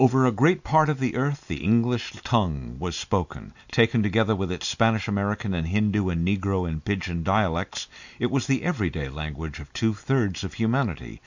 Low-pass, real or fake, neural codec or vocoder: 7.2 kHz; real; none